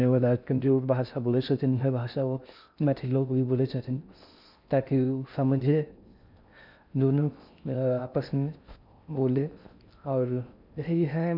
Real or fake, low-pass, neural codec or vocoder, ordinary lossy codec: fake; 5.4 kHz; codec, 16 kHz in and 24 kHz out, 0.6 kbps, FocalCodec, streaming, 4096 codes; none